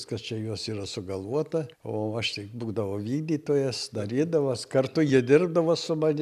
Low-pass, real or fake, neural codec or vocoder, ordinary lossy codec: 14.4 kHz; real; none; AAC, 96 kbps